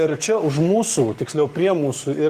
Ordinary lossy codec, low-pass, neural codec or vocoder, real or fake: Opus, 24 kbps; 14.4 kHz; codec, 44.1 kHz, 7.8 kbps, Pupu-Codec; fake